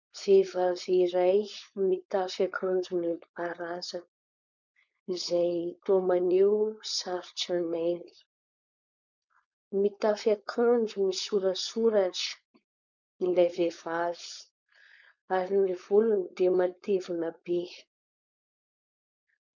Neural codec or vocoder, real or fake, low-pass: codec, 16 kHz, 4.8 kbps, FACodec; fake; 7.2 kHz